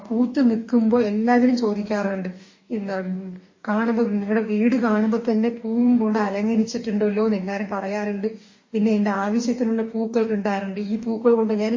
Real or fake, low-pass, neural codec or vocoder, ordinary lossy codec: fake; 7.2 kHz; codec, 44.1 kHz, 2.6 kbps, DAC; MP3, 32 kbps